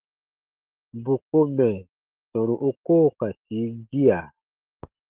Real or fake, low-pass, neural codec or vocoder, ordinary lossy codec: real; 3.6 kHz; none; Opus, 16 kbps